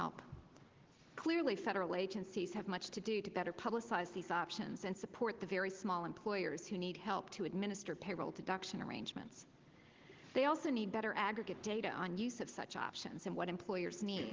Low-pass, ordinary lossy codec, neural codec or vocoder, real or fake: 7.2 kHz; Opus, 16 kbps; none; real